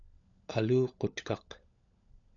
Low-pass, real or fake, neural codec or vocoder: 7.2 kHz; fake; codec, 16 kHz, 16 kbps, FunCodec, trained on LibriTTS, 50 frames a second